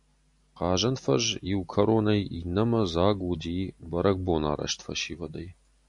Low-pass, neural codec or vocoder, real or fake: 10.8 kHz; none; real